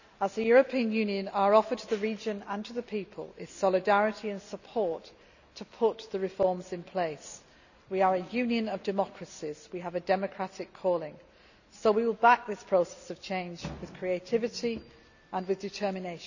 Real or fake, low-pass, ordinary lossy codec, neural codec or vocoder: real; 7.2 kHz; none; none